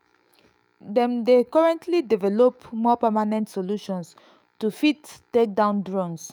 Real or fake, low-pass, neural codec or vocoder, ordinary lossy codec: fake; none; autoencoder, 48 kHz, 128 numbers a frame, DAC-VAE, trained on Japanese speech; none